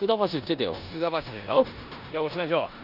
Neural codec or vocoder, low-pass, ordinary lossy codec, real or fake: codec, 16 kHz in and 24 kHz out, 0.9 kbps, LongCat-Audio-Codec, four codebook decoder; 5.4 kHz; none; fake